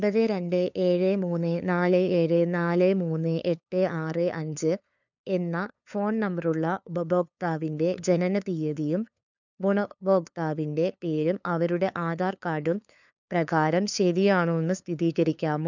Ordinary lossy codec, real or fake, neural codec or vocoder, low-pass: none; fake; codec, 16 kHz, 2 kbps, FunCodec, trained on LibriTTS, 25 frames a second; 7.2 kHz